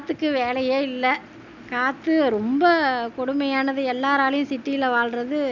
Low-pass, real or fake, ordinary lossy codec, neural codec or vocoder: 7.2 kHz; real; none; none